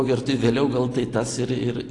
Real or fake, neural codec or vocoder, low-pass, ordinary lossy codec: real; none; 10.8 kHz; AAC, 48 kbps